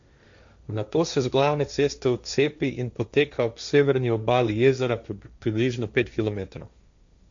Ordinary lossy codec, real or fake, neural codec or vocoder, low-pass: MP3, 64 kbps; fake; codec, 16 kHz, 1.1 kbps, Voila-Tokenizer; 7.2 kHz